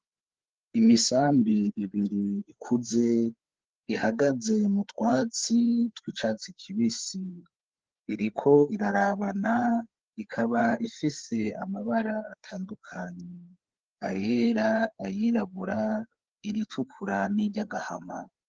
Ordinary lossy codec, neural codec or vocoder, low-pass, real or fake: Opus, 16 kbps; codec, 16 kHz, 4 kbps, FreqCodec, larger model; 7.2 kHz; fake